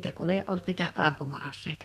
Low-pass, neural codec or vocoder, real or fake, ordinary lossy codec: 14.4 kHz; codec, 32 kHz, 1.9 kbps, SNAC; fake; none